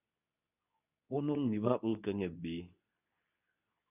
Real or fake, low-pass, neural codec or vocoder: fake; 3.6 kHz; codec, 24 kHz, 0.9 kbps, WavTokenizer, medium speech release version 2